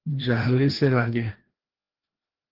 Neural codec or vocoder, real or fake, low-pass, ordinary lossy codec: codec, 16 kHz, 1 kbps, FreqCodec, larger model; fake; 5.4 kHz; Opus, 16 kbps